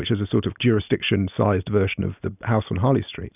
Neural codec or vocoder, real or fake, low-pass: none; real; 3.6 kHz